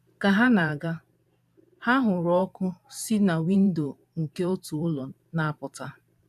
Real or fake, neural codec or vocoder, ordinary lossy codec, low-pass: fake; vocoder, 44.1 kHz, 128 mel bands every 512 samples, BigVGAN v2; none; 14.4 kHz